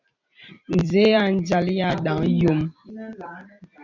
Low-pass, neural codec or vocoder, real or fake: 7.2 kHz; vocoder, 44.1 kHz, 128 mel bands every 256 samples, BigVGAN v2; fake